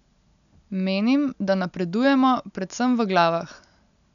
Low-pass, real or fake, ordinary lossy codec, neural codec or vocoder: 7.2 kHz; real; none; none